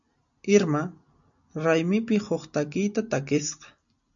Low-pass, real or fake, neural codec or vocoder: 7.2 kHz; real; none